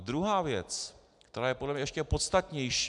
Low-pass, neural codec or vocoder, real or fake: 10.8 kHz; none; real